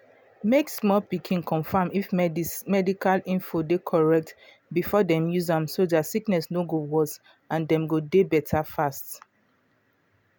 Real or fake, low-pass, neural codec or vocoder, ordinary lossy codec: real; none; none; none